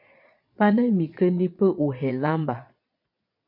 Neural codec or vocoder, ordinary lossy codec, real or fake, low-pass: vocoder, 22.05 kHz, 80 mel bands, Vocos; MP3, 32 kbps; fake; 5.4 kHz